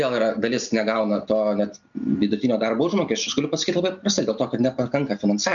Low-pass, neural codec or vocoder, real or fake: 7.2 kHz; none; real